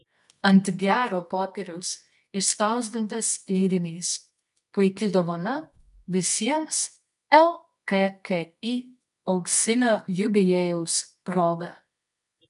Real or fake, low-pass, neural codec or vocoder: fake; 10.8 kHz; codec, 24 kHz, 0.9 kbps, WavTokenizer, medium music audio release